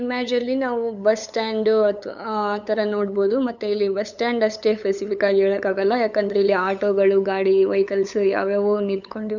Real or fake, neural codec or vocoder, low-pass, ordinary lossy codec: fake; codec, 16 kHz, 8 kbps, FunCodec, trained on LibriTTS, 25 frames a second; 7.2 kHz; none